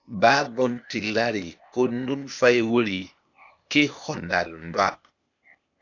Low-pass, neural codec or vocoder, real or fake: 7.2 kHz; codec, 16 kHz, 0.8 kbps, ZipCodec; fake